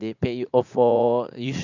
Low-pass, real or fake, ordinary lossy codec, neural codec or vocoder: 7.2 kHz; fake; none; vocoder, 44.1 kHz, 80 mel bands, Vocos